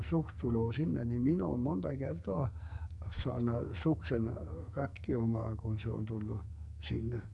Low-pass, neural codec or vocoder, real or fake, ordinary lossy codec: 10.8 kHz; codec, 44.1 kHz, 2.6 kbps, SNAC; fake; none